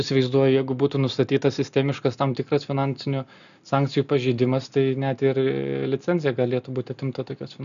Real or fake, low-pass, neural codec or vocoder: real; 7.2 kHz; none